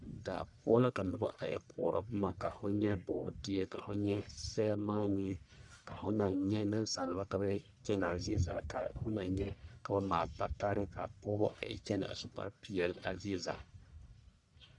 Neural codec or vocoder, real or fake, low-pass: codec, 44.1 kHz, 1.7 kbps, Pupu-Codec; fake; 10.8 kHz